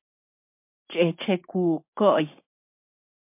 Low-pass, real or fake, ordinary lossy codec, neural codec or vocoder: 3.6 kHz; fake; MP3, 32 kbps; codec, 16 kHz, 6 kbps, DAC